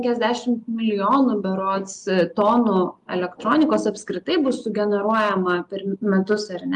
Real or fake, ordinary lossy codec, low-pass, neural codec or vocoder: real; Opus, 32 kbps; 10.8 kHz; none